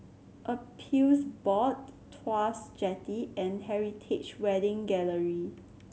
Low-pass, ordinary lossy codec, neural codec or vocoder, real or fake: none; none; none; real